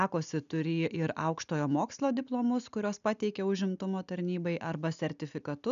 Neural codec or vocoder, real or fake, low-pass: none; real; 7.2 kHz